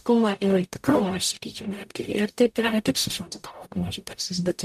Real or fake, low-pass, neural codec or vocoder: fake; 14.4 kHz; codec, 44.1 kHz, 0.9 kbps, DAC